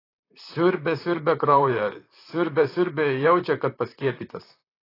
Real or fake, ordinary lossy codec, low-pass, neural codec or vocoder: fake; AAC, 24 kbps; 5.4 kHz; vocoder, 44.1 kHz, 128 mel bands, Pupu-Vocoder